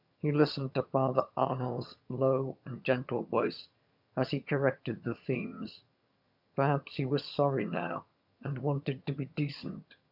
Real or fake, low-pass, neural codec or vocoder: fake; 5.4 kHz; vocoder, 22.05 kHz, 80 mel bands, HiFi-GAN